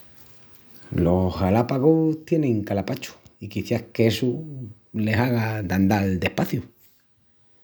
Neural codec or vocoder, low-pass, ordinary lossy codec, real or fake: none; none; none; real